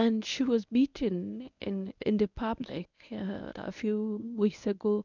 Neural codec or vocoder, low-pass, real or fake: codec, 24 kHz, 0.9 kbps, WavTokenizer, medium speech release version 1; 7.2 kHz; fake